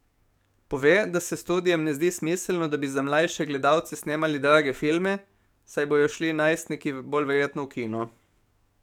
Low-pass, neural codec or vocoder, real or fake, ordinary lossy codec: 19.8 kHz; codec, 44.1 kHz, 7.8 kbps, DAC; fake; none